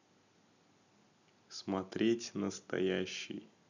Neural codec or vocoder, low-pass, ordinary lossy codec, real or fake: none; 7.2 kHz; none; real